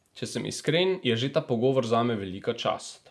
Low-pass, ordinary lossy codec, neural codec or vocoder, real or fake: none; none; none; real